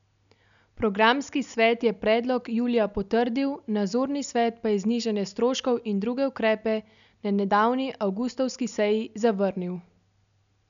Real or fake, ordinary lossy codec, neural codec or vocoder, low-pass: real; none; none; 7.2 kHz